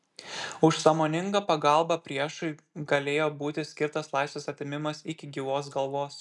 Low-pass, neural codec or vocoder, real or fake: 10.8 kHz; none; real